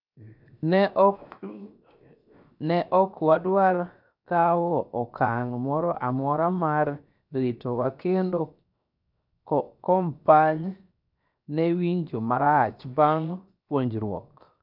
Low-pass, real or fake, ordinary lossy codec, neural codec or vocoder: 5.4 kHz; fake; none; codec, 16 kHz, 0.7 kbps, FocalCodec